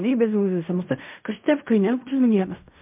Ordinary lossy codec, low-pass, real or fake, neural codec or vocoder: MP3, 32 kbps; 3.6 kHz; fake; codec, 16 kHz in and 24 kHz out, 0.4 kbps, LongCat-Audio-Codec, fine tuned four codebook decoder